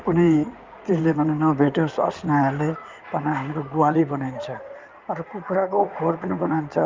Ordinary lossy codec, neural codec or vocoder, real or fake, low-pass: Opus, 24 kbps; codec, 16 kHz in and 24 kHz out, 2.2 kbps, FireRedTTS-2 codec; fake; 7.2 kHz